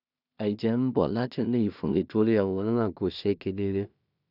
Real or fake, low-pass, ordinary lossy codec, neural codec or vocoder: fake; 5.4 kHz; none; codec, 16 kHz in and 24 kHz out, 0.4 kbps, LongCat-Audio-Codec, two codebook decoder